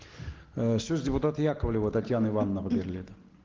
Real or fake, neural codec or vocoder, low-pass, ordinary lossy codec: real; none; 7.2 kHz; Opus, 16 kbps